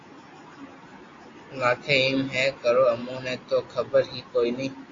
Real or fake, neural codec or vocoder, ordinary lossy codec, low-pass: real; none; AAC, 32 kbps; 7.2 kHz